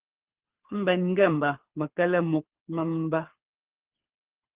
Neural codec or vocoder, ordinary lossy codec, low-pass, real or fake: codec, 24 kHz, 6 kbps, HILCodec; Opus, 16 kbps; 3.6 kHz; fake